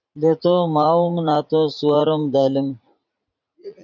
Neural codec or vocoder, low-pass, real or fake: vocoder, 44.1 kHz, 80 mel bands, Vocos; 7.2 kHz; fake